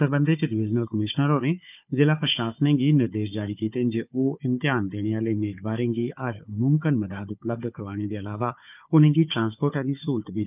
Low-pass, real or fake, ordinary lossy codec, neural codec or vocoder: 3.6 kHz; fake; none; codec, 16 kHz, 4 kbps, FunCodec, trained on Chinese and English, 50 frames a second